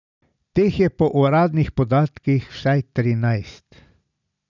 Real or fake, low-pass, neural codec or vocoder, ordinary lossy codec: real; 7.2 kHz; none; none